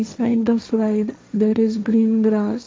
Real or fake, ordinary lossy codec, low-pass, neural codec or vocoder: fake; none; none; codec, 16 kHz, 1.1 kbps, Voila-Tokenizer